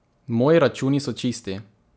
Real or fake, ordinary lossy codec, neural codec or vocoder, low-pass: real; none; none; none